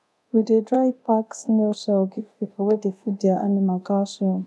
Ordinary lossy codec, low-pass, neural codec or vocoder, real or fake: none; none; codec, 24 kHz, 0.9 kbps, DualCodec; fake